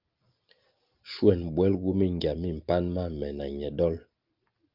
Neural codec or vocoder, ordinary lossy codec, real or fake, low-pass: none; Opus, 24 kbps; real; 5.4 kHz